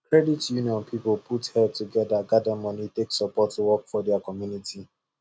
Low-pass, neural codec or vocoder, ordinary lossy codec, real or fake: none; none; none; real